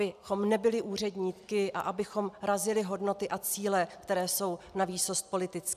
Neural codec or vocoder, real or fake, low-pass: none; real; 14.4 kHz